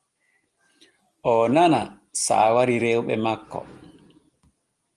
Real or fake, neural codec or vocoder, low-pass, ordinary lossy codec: real; none; 10.8 kHz; Opus, 24 kbps